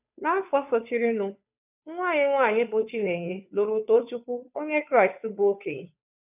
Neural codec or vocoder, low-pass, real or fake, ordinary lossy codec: codec, 16 kHz, 2 kbps, FunCodec, trained on Chinese and English, 25 frames a second; 3.6 kHz; fake; none